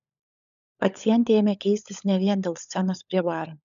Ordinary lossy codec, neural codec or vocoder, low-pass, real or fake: AAC, 96 kbps; codec, 16 kHz, 16 kbps, FunCodec, trained on LibriTTS, 50 frames a second; 7.2 kHz; fake